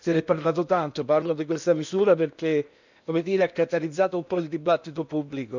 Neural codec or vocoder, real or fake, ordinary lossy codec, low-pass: codec, 16 kHz in and 24 kHz out, 0.8 kbps, FocalCodec, streaming, 65536 codes; fake; none; 7.2 kHz